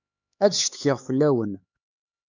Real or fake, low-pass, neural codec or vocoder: fake; 7.2 kHz; codec, 16 kHz, 4 kbps, X-Codec, HuBERT features, trained on LibriSpeech